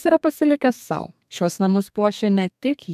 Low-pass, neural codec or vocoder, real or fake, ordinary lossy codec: 14.4 kHz; codec, 32 kHz, 1.9 kbps, SNAC; fake; MP3, 96 kbps